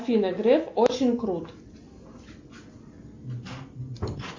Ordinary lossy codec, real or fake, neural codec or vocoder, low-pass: MP3, 64 kbps; real; none; 7.2 kHz